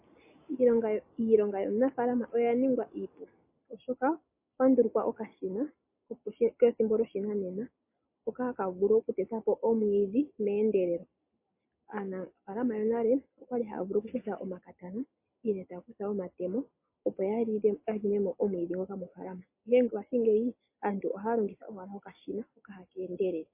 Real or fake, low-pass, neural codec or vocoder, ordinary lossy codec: real; 3.6 kHz; none; MP3, 24 kbps